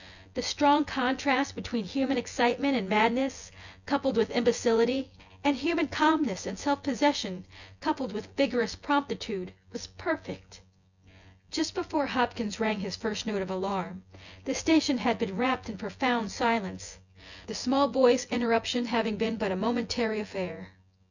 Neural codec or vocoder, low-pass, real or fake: vocoder, 24 kHz, 100 mel bands, Vocos; 7.2 kHz; fake